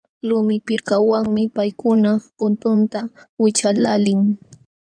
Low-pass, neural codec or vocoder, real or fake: 9.9 kHz; codec, 16 kHz in and 24 kHz out, 2.2 kbps, FireRedTTS-2 codec; fake